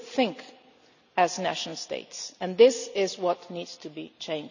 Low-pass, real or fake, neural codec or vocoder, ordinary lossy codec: 7.2 kHz; real; none; none